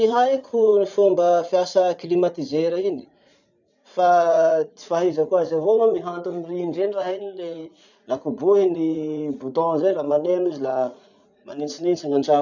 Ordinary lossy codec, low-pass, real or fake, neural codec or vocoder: none; 7.2 kHz; fake; vocoder, 44.1 kHz, 80 mel bands, Vocos